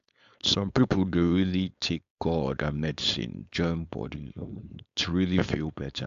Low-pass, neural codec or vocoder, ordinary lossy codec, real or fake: 7.2 kHz; codec, 16 kHz, 4.8 kbps, FACodec; AAC, 64 kbps; fake